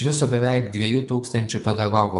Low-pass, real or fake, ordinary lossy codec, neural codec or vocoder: 10.8 kHz; fake; MP3, 96 kbps; codec, 24 kHz, 3 kbps, HILCodec